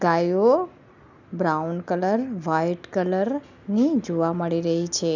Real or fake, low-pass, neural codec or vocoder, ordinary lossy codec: real; 7.2 kHz; none; none